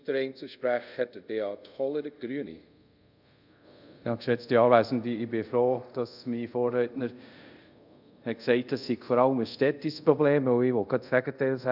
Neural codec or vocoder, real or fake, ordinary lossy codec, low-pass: codec, 24 kHz, 0.5 kbps, DualCodec; fake; none; 5.4 kHz